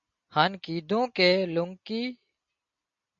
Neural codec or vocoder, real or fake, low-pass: none; real; 7.2 kHz